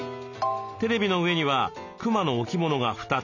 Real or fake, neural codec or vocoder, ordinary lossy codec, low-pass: real; none; none; 7.2 kHz